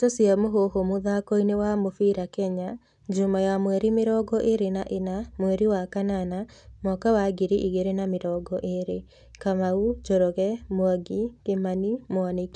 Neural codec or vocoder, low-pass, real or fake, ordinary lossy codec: none; 10.8 kHz; real; none